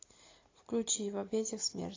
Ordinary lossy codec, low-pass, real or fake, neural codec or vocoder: AAC, 32 kbps; 7.2 kHz; real; none